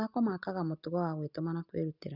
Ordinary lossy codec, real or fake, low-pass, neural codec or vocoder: none; real; 5.4 kHz; none